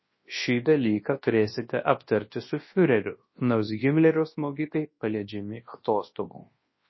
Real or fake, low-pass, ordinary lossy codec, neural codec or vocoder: fake; 7.2 kHz; MP3, 24 kbps; codec, 24 kHz, 0.9 kbps, WavTokenizer, large speech release